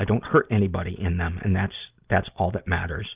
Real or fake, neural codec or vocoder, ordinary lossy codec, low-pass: real; none; Opus, 24 kbps; 3.6 kHz